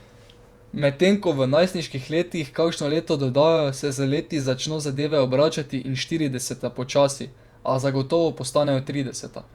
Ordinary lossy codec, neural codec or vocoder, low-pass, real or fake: Opus, 64 kbps; vocoder, 48 kHz, 128 mel bands, Vocos; 19.8 kHz; fake